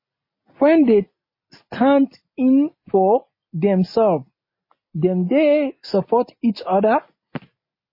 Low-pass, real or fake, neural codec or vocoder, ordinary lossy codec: 5.4 kHz; real; none; MP3, 24 kbps